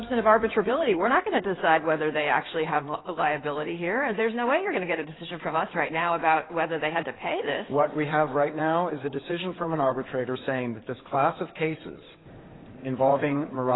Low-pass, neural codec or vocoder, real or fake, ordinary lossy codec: 7.2 kHz; codec, 16 kHz in and 24 kHz out, 2.2 kbps, FireRedTTS-2 codec; fake; AAC, 16 kbps